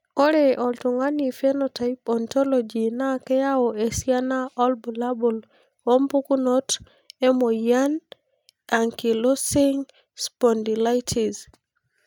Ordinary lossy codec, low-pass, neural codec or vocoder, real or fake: none; 19.8 kHz; none; real